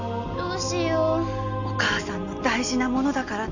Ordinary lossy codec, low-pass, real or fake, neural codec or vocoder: none; 7.2 kHz; real; none